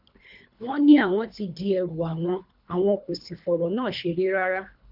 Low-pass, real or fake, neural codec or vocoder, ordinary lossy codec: 5.4 kHz; fake; codec, 24 kHz, 3 kbps, HILCodec; none